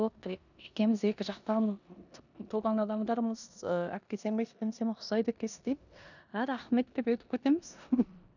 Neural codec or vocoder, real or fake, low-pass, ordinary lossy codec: codec, 16 kHz in and 24 kHz out, 0.9 kbps, LongCat-Audio-Codec, four codebook decoder; fake; 7.2 kHz; none